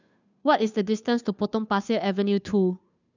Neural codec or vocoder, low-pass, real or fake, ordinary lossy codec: codec, 16 kHz, 2 kbps, FunCodec, trained on Chinese and English, 25 frames a second; 7.2 kHz; fake; none